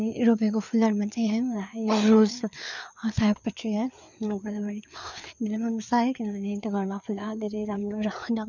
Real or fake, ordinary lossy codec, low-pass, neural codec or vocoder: fake; none; 7.2 kHz; codec, 16 kHz, 4 kbps, FreqCodec, larger model